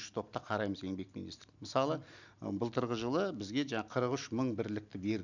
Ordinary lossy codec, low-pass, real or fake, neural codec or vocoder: none; 7.2 kHz; real; none